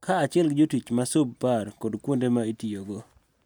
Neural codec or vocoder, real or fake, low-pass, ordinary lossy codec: none; real; none; none